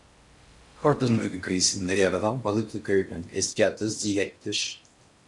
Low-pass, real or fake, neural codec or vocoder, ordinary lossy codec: 10.8 kHz; fake; codec, 16 kHz in and 24 kHz out, 0.6 kbps, FocalCodec, streaming, 4096 codes; MP3, 64 kbps